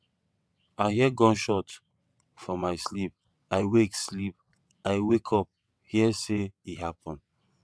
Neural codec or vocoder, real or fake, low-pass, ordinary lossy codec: vocoder, 22.05 kHz, 80 mel bands, WaveNeXt; fake; none; none